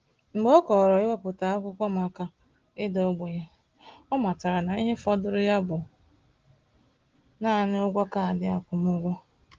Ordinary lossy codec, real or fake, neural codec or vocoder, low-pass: Opus, 16 kbps; real; none; 7.2 kHz